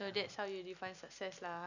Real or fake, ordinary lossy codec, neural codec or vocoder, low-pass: real; none; none; 7.2 kHz